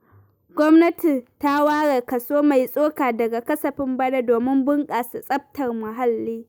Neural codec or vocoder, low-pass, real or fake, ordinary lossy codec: none; none; real; none